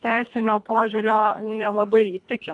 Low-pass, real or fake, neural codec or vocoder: 10.8 kHz; fake; codec, 24 kHz, 1.5 kbps, HILCodec